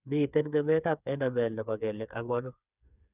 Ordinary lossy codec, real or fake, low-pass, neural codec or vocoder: none; fake; 3.6 kHz; codec, 16 kHz, 4 kbps, FreqCodec, smaller model